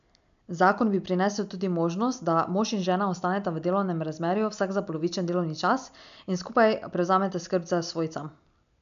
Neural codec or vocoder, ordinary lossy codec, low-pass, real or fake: none; none; 7.2 kHz; real